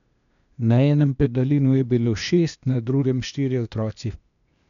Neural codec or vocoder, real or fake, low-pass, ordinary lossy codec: codec, 16 kHz, 0.8 kbps, ZipCodec; fake; 7.2 kHz; none